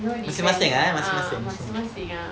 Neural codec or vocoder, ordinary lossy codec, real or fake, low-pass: none; none; real; none